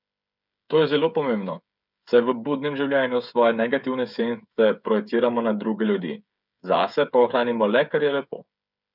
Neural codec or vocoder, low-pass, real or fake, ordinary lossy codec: codec, 16 kHz, 8 kbps, FreqCodec, smaller model; 5.4 kHz; fake; none